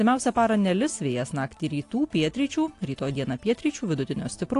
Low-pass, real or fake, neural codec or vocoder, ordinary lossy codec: 10.8 kHz; real; none; AAC, 48 kbps